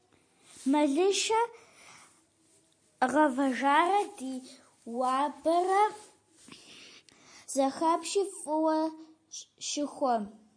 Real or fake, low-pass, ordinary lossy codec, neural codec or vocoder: real; 9.9 kHz; MP3, 48 kbps; none